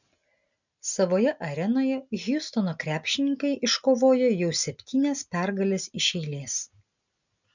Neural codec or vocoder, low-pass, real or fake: none; 7.2 kHz; real